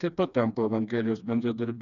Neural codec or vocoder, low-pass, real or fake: codec, 16 kHz, 2 kbps, FreqCodec, smaller model; 7.2 kHz; fake